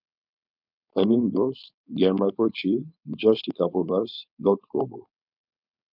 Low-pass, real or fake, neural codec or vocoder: 5.4 kHz; fake; codec, 16 kHz, 4.8 kbps, FACodec